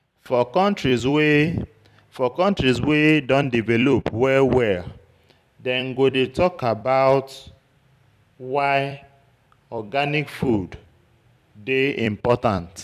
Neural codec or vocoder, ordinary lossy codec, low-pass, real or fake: vocoder, 44.1 kHz, 128 mel bands every 256 samples, BigVGAN v2; none; 14.4 kHz; fake